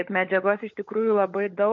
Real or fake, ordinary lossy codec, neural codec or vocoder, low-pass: fake; MP3, 48 kbps; codec, 16 kHz, 16 kbps, FunCodec, trained on LibriTTS, 50 frames a second; 7.2 kHz